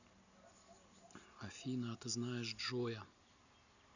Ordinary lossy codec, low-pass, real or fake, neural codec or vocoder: none; 7.2 kHz; real; none